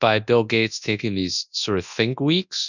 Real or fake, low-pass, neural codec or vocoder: fake; 7.2 kHz; codec, 24 kHz, 0.9 kbps, WavTokenizer, large speech release